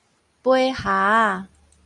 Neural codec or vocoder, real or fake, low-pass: none; real; 10.8 kHz